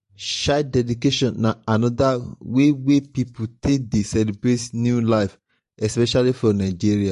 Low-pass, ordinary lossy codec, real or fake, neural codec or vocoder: 14.4 kHz; MP3, 48 kbps; fake; vocoder, 44.1 kHz, 128 mel bands every 512 samples, BigVGAN v2